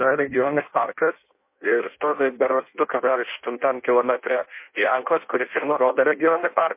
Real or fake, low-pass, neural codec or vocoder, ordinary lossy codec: fake; 3.6 kHz; codec, 16 kHz in and 24 kHz out, 0.6 kbps, FireRedTTS-2 codec; MP3, 24 kbps